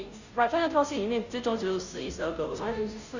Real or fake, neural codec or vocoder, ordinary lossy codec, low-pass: fake; codec, 16 kHz, 0.5 kbps, FunCodec, trained on Chinese and English, 25 frames a second; none; 7.2 kHz